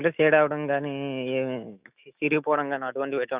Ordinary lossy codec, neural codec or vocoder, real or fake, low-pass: Opus, 64 kbps; autoencoder, 48 kHz, 128 numbers a frame, DAC-VAE, trained on Japanese speech; fake; 3.6 kHz